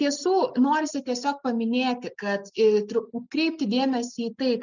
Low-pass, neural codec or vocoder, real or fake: 7.2 kHz; none; real